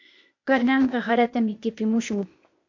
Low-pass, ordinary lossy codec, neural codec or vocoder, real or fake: 7.2 kHz; MP3, 48 kbps; codec, 16 kHz, 0.8 kbps, ZipCodec; fake